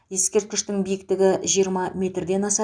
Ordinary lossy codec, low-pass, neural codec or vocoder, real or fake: none; 9.9 kHz; none; real